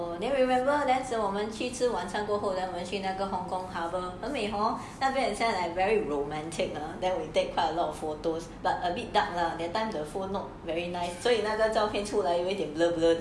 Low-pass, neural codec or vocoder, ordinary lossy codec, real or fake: none; none; none; real